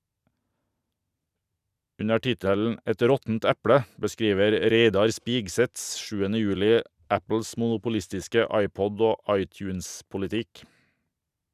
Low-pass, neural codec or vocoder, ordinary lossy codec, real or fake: 14.4 kHz; none; none; real